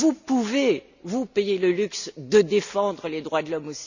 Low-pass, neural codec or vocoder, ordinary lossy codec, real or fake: 7.2 kHz; none; none; real